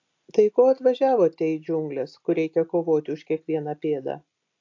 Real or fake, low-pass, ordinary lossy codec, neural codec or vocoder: real; 7.2 kHz; AAC, 48 kbps; none